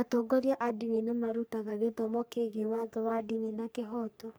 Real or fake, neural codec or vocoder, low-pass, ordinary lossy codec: fake; codec, 44.1 kHz, 3.4 kbps, Pupu-Codec; none; none